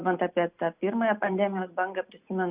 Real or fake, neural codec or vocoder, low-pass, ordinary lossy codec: real; none; 3.6 kHz; Opus, 64 kbps